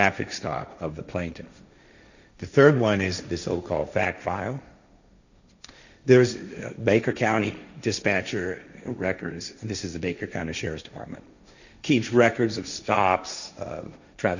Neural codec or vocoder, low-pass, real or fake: codec, 16 kHz, 1.1 kbps, Voila-Tokenizer; 7.2 kHz; fake